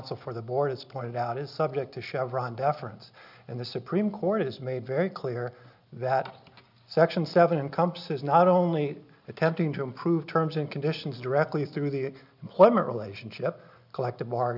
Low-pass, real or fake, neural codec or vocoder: 5.4 kHz; real; none